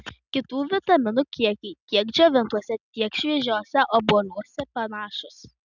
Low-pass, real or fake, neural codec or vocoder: 7.2 kHz; real; none